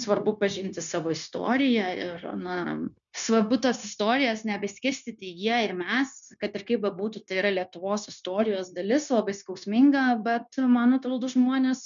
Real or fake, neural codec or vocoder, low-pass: fake; codec, 16 kHz, 0.9 kbps, LongCat-Audio-Codec; 7.2 kHz